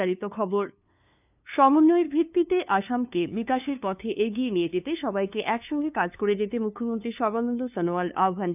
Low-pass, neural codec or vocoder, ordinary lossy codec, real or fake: 3.6 kHz; codec, 16 kHz, 2 kbps, FunCodec, trained on LibriTTS, 25 frames a second; none; fake